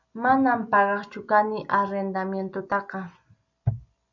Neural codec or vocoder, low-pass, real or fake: none; 7.2 kHz; real